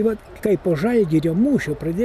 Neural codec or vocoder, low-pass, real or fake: none; 14.4 kHz; real